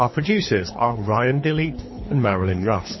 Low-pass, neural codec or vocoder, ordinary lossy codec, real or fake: 7.2 kHz; codec, 24 kHz, 3 kbps, HILCodec; MP3, 24 kbps; fake